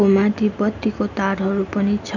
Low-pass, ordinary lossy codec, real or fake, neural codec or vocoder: 7.2 kHz; none; real; none